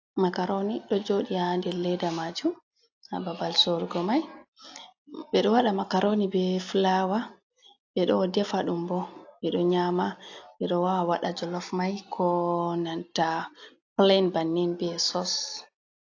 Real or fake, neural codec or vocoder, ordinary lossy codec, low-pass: real; none; AAC, 48 kbps; 7.2 kHz